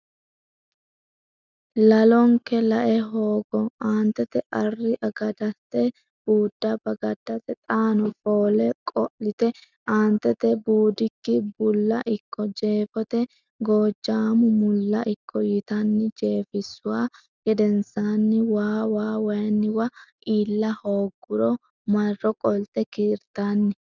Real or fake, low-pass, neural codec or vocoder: real; 7.2 kHz; none